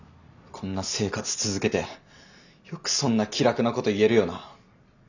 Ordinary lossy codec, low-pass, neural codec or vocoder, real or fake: none; 7.2 kHz; none; real